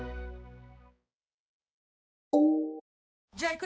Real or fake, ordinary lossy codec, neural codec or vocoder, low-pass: fake; none; codec, 16 kHz, 4 kbps, X-Codec, HuBERT features, trained on balanced general audio; none